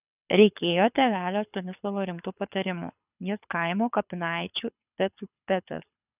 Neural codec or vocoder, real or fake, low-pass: codec, 24 kHz, 6 kbps, HILCodec; fake; 3.6 kHz